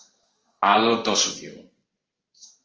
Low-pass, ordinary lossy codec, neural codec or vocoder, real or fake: 7.2 kHz; Opus, 24 kbps; codec, 16 kHz in and 24 kHz out, 1 kbps, XY-Tokenizer; fake